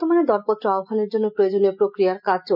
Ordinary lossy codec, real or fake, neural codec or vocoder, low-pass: none; real; none; 5.4 kHz